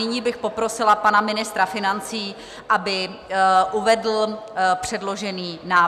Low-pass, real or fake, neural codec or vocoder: 14.4 kHz; real; none